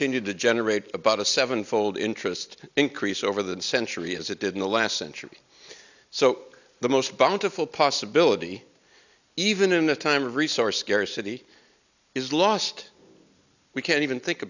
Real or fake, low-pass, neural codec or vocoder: real; 7.2 kHz; none